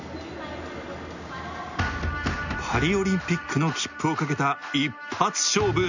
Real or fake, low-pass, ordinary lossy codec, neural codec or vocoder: real; 7.2 kHz; none; none